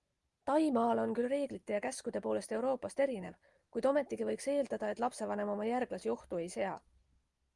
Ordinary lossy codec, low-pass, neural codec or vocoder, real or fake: Opus, 32 kbps; 10.8 kHz; none; real